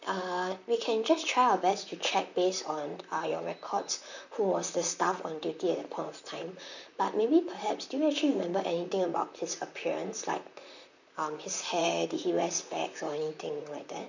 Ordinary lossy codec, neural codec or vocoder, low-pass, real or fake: none; vocoder, 44.1 kHz, 128 mel bands, Pupu-Vocoder; 7.2 kHz; fake